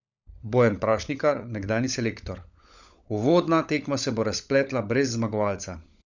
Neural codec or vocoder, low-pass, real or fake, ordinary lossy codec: codec, 16 kHz, 16 kbps, FunCodec, trained on LibriTTS, 50 frames a second; 7.2 kHz; fake; none